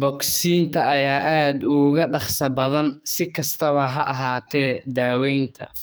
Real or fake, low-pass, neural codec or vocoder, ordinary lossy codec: fake; none; codec, 44.1 kHz, 2.6 kbps, SNAC; none